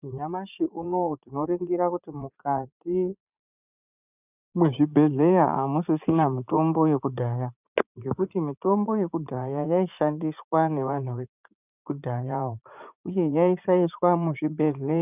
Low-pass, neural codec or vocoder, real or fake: 3.6 kHz; vocoder, 44.1 kHz, 80 mel bands, Vocos; fake